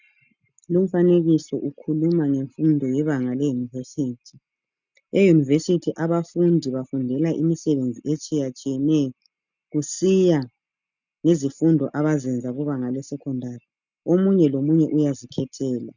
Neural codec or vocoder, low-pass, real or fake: none; 7.2 kHz; real